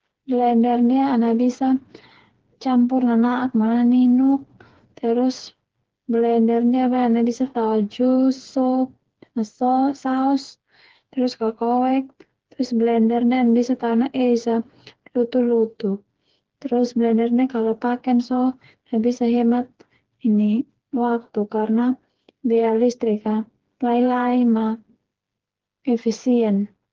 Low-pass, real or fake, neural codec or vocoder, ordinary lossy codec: 7.2 kHz; fake; codec, 16 kHz, 4 kbps, FreqCodec, smaller model; Opus, 16 kbps